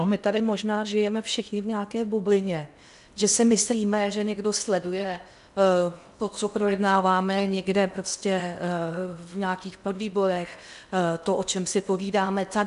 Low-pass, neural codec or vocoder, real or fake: 10.8 kHz; codec, 16 kHz in and 24 kHz out, 0.8 kbps, FocalCodec, streaming, 65536 codes; fake